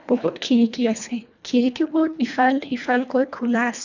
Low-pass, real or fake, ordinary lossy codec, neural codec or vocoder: 7.2 kHz; fake; none; codec, 24 kHz, 1.5 kbps, HILCodec